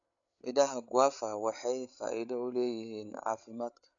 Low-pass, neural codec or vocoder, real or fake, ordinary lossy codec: 7.2 kHz; codec, 16 kHz, 8 kbps, FreqCodec, larger model; fake; none